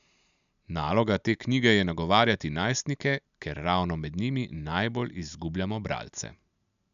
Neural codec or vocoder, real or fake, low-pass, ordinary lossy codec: none; real; 7.2 kHz; none